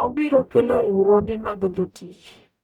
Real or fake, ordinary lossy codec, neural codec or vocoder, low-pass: fake; none; codec, 44.1 kHz, 0.9 kbps, DAC; 19.8 kHz